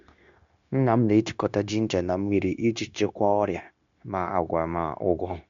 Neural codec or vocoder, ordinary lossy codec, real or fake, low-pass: codec, 16 kHz, 0.9 kbps, LongCat-Audio-Codec; MP3, 64 kbps; fake; 7.2 kHz